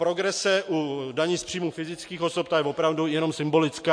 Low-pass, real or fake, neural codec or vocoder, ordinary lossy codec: 9.9 kHz; real; none; MP3, 48 kbps